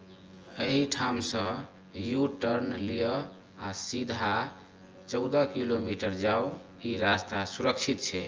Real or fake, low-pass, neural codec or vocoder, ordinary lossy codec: fake; 7.2 kHz; vocoder, 24 kHz, 100 mel bands, Vocos; Opus, 24 kbps